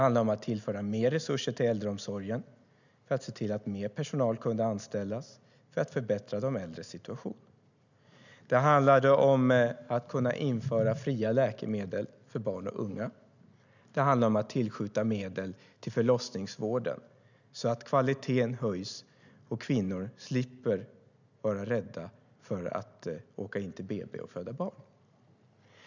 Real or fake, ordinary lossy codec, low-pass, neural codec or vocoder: real; none; 7.2 kHz; none